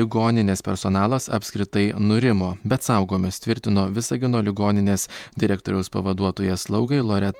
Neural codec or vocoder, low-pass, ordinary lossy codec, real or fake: vocoder, 48 kHz, 128 mel bands, Vocos; 19.8 kHz; MP3, 96 kbps; fake